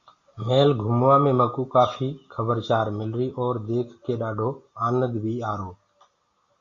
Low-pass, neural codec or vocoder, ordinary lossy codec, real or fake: 7.2 kHz; none; Opus, 64 kbps; real